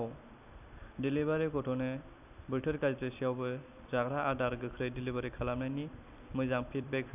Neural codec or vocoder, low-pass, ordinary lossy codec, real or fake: vocoder, 44.1 kHz, 128 mel bands every 256 samples, BigVGAN v2; 3.6 kHz; none; fake